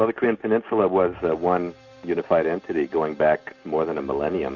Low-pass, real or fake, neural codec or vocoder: 7.2 kHz; real; none